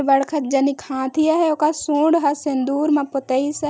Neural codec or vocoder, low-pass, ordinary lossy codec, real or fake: none; none; none; real